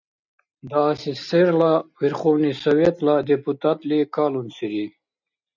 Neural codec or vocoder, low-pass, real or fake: none; 7.2 kHz; real